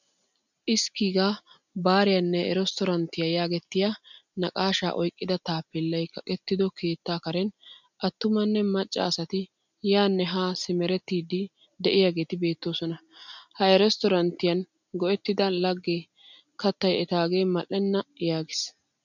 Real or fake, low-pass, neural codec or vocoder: real; 7.2 kHz; none